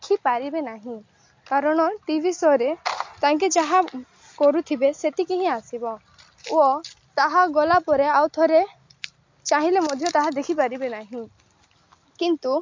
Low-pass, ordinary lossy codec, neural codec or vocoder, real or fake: 7.2 kHz; MP3, 48 kbps; none; real